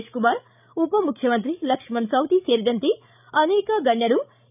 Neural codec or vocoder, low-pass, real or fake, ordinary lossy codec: codec, 16 kHz, 16 kbps, FreqCodec, larger model; 3.6 kHz; fake; none